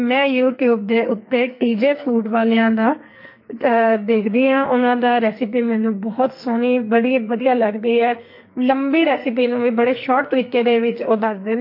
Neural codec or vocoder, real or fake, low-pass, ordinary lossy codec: codec, 44.1 kHz, 2.6 kbps, SNAC; fake; 5.4 kHz; MP3, 32 kbps